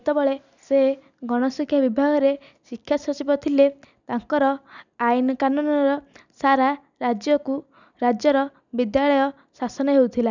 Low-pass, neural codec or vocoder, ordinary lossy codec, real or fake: 7.2 kHz; none; none; real